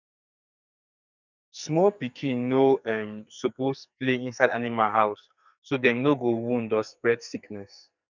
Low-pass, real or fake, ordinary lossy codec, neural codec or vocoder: 7.2 kHz; fake; none; codec, 44.1 kHz, 2.6 kbps, SNAC